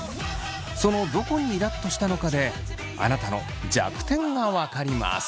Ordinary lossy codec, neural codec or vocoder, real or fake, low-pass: none; none; real; none